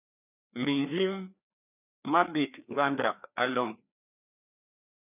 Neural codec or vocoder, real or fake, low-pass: codec, 16 kHz, 2 kbps, FreqCodec, larger model; fake; 3.6 kHz